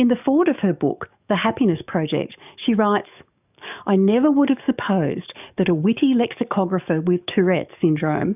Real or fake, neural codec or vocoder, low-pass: fake; codec, 44.1 kHz, 7.8 kbps, DAC; 3.6 kHz